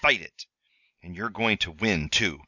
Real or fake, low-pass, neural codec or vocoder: real; 7.2 kHz; none